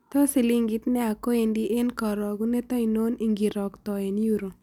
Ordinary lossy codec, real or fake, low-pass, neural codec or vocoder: none; real; 19.8 kHz; none